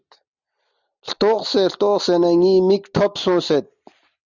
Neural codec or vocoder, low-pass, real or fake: none; 7.2 kHz; real